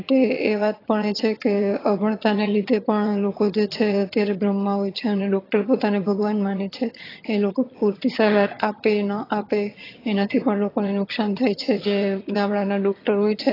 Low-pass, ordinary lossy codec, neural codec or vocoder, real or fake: 5.4 kHz; AAC, 24 kbps; vocoder, 22.05 kHz, 80 mel bands, HiFi-GAN; fake